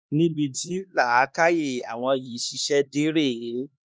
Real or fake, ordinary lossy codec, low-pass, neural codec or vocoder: fake; none; none; codec, 16 kHz, 2 kbps, X-Codec, HuBERT features, trained on LibriSpeech